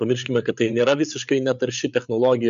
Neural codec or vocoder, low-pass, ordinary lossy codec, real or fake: codec, 16 kHz, 8 kbps, FreqCodec, larger model; 7.2 kHz; MP3, 64 kbps; fake